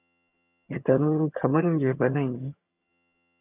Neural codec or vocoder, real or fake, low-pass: vocoder, 22.05 kHz, 80 mel bands, HiFi-GAN; fake; 3.6 kHz